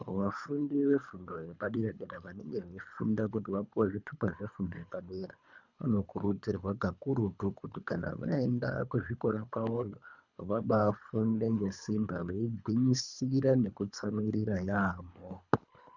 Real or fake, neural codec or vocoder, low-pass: fake; codec, 24 kHz, 3 kbps, HILCodec; 7.2 kHz